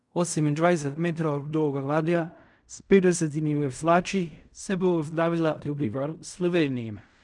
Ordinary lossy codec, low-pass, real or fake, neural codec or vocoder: none; 10.8 kHz; fake; codec, 16 kHz in and 24 kHz out, 0.4 kbps, LongCat-Audio-Codec, fine tuned four codebook decoder